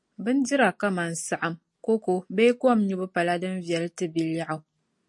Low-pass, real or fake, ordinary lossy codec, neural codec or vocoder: 10.8 kHz; real; MP3, 48 kbps; none